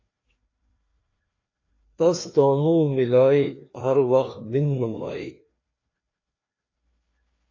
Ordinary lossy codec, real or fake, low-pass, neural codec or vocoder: AAC, 32 kbps; fake; 7.2 kHz; codec, 16 kHz, 2 kbps, FreqCodec, larger model